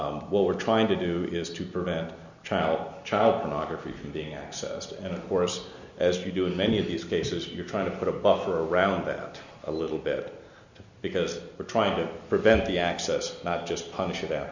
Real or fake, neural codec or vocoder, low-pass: real; none; 7.2 kHz